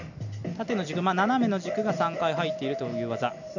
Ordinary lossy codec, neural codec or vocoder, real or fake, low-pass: none; none; real; 7.2 kHz